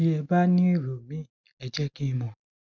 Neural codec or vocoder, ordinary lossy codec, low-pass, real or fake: none; none; 7.2 kHz; real